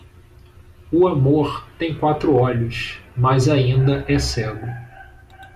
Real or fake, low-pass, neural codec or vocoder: real; 14.4 kHz; none